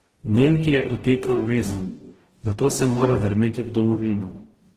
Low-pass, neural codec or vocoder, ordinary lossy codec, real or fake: 14.4 kHz; codec, 44.1 kHz, 0.9 kbps, DAC; Opus, 16 kbps; fake